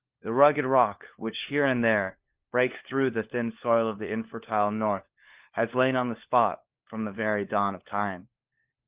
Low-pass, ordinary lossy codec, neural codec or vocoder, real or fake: 3.6 kHz; Opus, 32 kbps; codec, 16 kHz, 4 kbps, FunCodec, trained on LibriTTS, 50 frames a second; fake